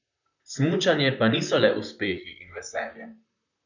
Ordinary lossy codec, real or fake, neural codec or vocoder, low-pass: none; fake; vocoder, 44.1 kHz, 128 mel bands, Pupu-Vocoder; 7.2 kHz